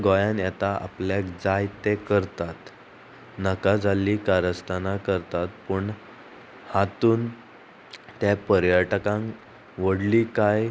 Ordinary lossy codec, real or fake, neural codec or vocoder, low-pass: none; real; none; none